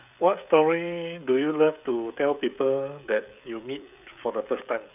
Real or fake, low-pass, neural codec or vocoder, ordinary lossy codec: fake; 3.6 kHz; codec, 16 kHz, 16 kbps, FreqCodec, smaller model; none